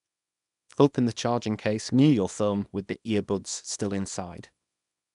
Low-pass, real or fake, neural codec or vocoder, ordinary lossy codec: 10.8 kHz; fake; codec, 24 kHz, 0.9 kbps, WavTokenizer, small release; none